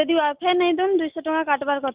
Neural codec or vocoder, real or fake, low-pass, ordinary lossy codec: none; real; 3.6 kHz; Opus, 24 kbps